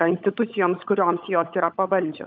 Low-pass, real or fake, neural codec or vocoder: 7.2 kHz; fake; codec, 16 kHz, 16 kbps, FunCodec, trained on LibriTTS, 50 frames a second